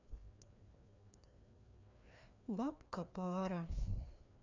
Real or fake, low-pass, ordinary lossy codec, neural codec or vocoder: fake; 7.2 kHz; none; codec, 16 kHz, 2 kbps, FreqCodec, larger model